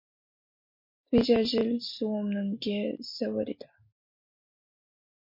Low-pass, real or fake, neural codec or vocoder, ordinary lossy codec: 5.4 kHz; real; none; MP3, 32 kbps